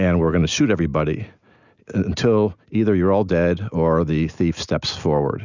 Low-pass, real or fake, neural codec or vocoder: 7.2 kHz; real; none